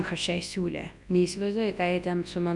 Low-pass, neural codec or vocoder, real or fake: 10.8 kHz; codec, 24 kHz, 0.9 kbps, WavTokenizer, large speech release; fake